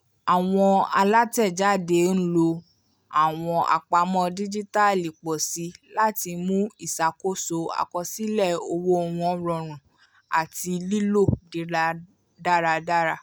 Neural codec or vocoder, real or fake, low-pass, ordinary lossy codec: none; real; none; none